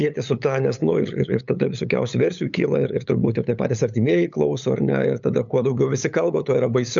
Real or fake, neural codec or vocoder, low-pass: fake; codec, 16 kHz, 8 kbps, FunCodec, trained on LibriTTS, 25 frames a second; 7.2 kHz